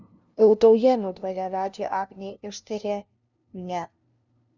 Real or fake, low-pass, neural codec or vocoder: fake; 7.2 kHz; codec, 16 kHz, 0.5 kbps, FunCodec, trained on LibriTTS, 25 frames a second